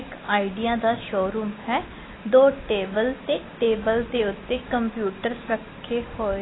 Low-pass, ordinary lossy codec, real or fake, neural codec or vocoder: 7.2 kHz; AAC, 16 kbps; real; none